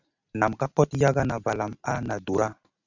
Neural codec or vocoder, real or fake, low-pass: none; real; 7.2 kHz